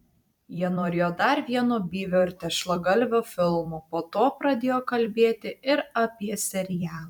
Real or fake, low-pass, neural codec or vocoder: fake; 19.8 kHz; vocoder, 44.1 kHz, 128 mel bands every 256 samples, BigVGAN v2